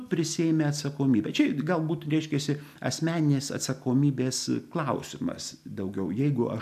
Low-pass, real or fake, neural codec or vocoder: 14.4 kHz; real; none